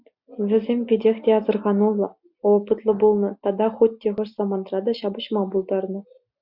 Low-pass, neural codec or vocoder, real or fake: 5.4 kHz; none; real